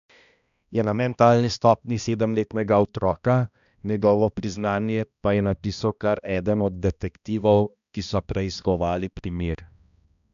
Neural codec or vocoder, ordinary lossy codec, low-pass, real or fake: codec, 16 kHz, 1 kbps, X-Codec, HuBERT features, trained on balanced general audio; none; 7.2 kHz; fake